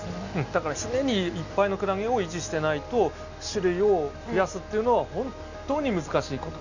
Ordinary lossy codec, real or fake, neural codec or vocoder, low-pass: none; real; none; 7.2 kHz